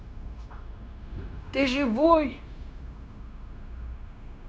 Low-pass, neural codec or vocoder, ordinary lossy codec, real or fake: none; codec, 16 kHz, 0.9 kbps, LongCat-Audio-Codec; none; fake